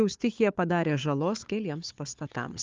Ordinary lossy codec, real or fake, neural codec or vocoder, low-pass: Opus, 32 kbps; fake; codec, 16 kHz, 4 kbps, FunCodec, trained on Chinese and English, 50 frames a second; 7.2 kHz